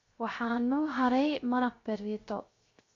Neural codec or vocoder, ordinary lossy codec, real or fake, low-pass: codec, 16 kHz, 0.3 kbps, FocalCodec; AAC, 32 kbps; fake; 7.2 kHz